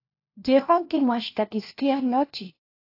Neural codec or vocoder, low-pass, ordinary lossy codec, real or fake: codec, 16 kHz, 1 kbps, FunCodec, trained on LibriTTS, 50 frames a second; 5.4 kHz; AAC, 32 kbps; fake